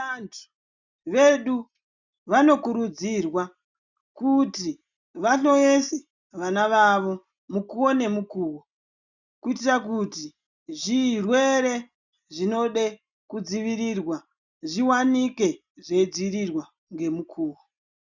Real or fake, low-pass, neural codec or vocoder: real; 7.2 kHz; none